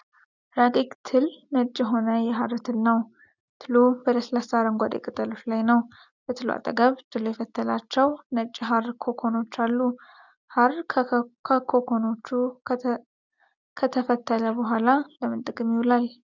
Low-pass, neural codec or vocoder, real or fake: 7.2 kHz; none; real